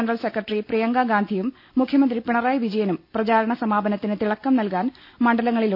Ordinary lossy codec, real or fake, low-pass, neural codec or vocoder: none; real; 5.4 kHz; none